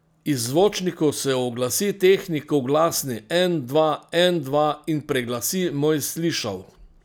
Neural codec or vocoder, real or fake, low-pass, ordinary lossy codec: none; real; none; none